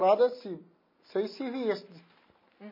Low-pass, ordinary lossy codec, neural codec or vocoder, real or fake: 5.4 kHz; MP3, 24 kbps; none; real